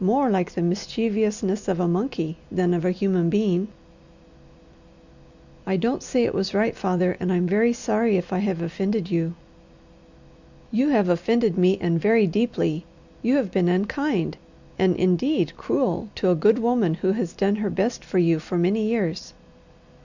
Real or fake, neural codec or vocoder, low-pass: real; none; 7.2 kHz